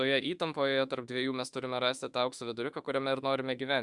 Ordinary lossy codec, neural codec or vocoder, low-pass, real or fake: Opus, 64 kbps; autoencoder, 48 kHz, 32 numbers a frame, DAC-VAE, trained on Japanese speech; 10.8 kHz; fake